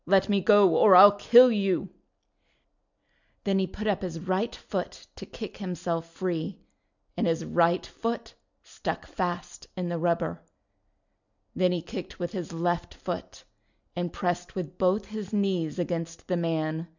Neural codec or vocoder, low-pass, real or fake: none; 7.2 kHz; real